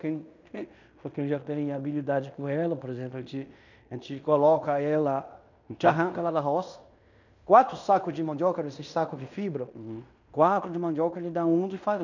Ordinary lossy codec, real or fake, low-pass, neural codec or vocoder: none; fake; 7.2 kHz; codec, 16 kHz in and 24 kHz out, 0.9 kbps, LongCat-Audio-Codec, fine tuned four codebook decoder